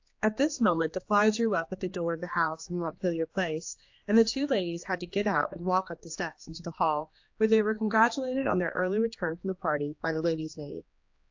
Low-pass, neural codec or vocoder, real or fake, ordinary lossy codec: 7.2 kHz; codec, 16 kHz, 2 kbps, X-Codec, HuBERT features, trained on general audio; fake; AAC, 48 kbps